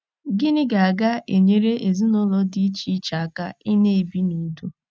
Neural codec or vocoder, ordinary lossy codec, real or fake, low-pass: none; none; real; none